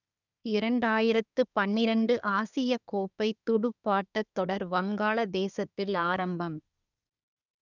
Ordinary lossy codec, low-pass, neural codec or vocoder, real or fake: none; 7.2 kHz; codec, 24 kHz, 1 kbps, SNAC; fake